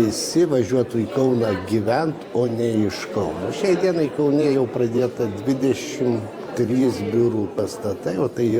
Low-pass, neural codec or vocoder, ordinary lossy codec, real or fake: 19.8 kHz; vocoder, 44.1 kHz, 128 mel bands every 512 samples, BigVGAN v2; Opus, 64 kbps; fake